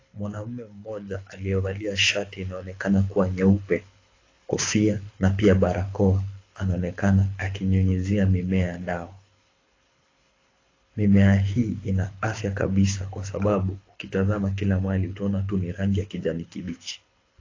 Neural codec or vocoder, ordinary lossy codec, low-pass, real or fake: codec, 24 kHz, 6 kbps, HILCodec; AAC, 32 kbps; 7.2 kHz; fake